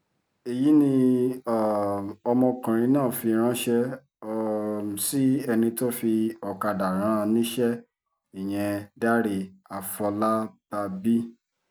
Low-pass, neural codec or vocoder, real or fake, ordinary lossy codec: none; none; real; none